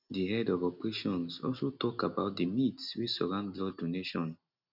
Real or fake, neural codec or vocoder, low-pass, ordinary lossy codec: real; none; 5.4 kHz; none